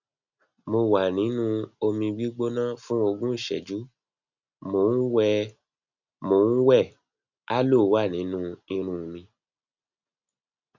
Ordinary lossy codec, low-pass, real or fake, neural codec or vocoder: none; 7.2 kHz; real; none